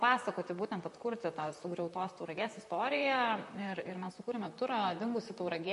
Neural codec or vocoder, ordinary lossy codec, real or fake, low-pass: vocoder, 44.1 kHz, 128 mel bands, Pupu-Vocoder; MP3, 48 kbps; fake; 14.4 kHz